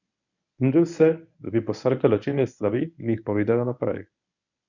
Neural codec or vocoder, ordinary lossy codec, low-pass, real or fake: codec, 24 kHz, 0.9 kbps, WavTokenizer, medium speech release version 1; none; 7.2 kHz; fake